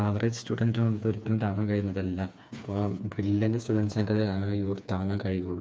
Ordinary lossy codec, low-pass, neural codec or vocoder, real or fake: none; none; codec, 16 kHz, 4 kbps, FreqCodec, smaller model; fake